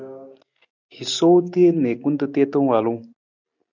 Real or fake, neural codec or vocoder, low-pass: real; none; 7.2 kHz